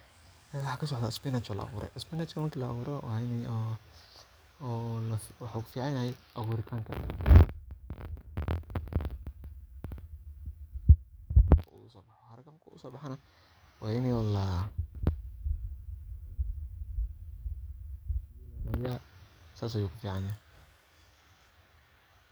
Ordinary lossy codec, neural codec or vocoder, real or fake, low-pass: none; codec, 44.1 kHz, 7.8 kbps, DAC; fake; none